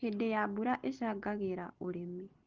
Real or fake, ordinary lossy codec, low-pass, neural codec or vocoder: real; Opus, 16 kbps; 7.2 kHz; none